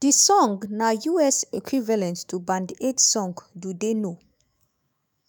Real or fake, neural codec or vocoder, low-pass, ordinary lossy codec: fake; autoencoder, 48 kHz, 128 numbers a frame, DAC-VAE, trained on Japanese speech; none; none